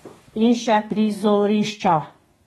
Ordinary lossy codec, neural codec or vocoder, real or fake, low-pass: AAC, 32 kbps; codec, 32 kHz, 1.9 kbps, SNAC; fake; 14.4 kHz